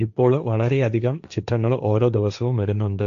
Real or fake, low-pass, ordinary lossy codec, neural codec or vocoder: fake; 7.2 kHz; none; codec, 16 kHz, 1.1 kbps, Voila-Tokenizer